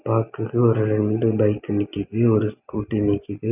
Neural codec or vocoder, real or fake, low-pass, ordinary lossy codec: none; real; 3.6 kHz; none